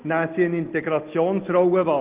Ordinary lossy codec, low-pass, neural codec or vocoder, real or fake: Opus, 16 kbps; 3.6 kHz; none; real